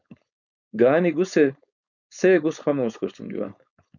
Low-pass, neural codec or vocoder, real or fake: 7.2 kHz; codec, 16 kHz, 4.8 kbps, FACodec; fake